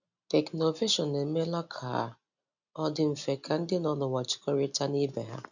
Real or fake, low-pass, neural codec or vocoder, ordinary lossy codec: real; 7.2 kHz; none; none